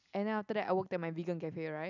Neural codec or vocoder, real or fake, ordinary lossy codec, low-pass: none; real; none; 7.2 kHz